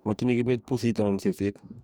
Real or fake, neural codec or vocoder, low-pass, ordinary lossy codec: fake; codec, 44.1 kHz, 2.6 kbps, DAC; none; none